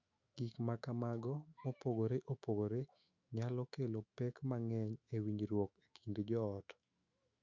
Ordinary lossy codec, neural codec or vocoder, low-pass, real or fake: Opus, 64 kbps; autoencoder, 48 kHz, 128 numbers a frame, DAC-VAE, trained on Japanese speech; 7.2 kHz; fake